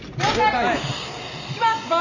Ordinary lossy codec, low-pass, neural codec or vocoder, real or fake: none; 7.2 kHz; none; real